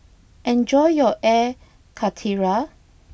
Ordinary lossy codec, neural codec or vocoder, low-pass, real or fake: none; none; none; real